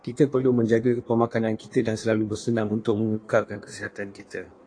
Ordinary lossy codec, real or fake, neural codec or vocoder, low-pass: AAC, 48 kbps; fake; codec, 16 kHz in and 24 kHz out, 1.1 kbps, FireRedTTS-2 codec; 9.9 kHz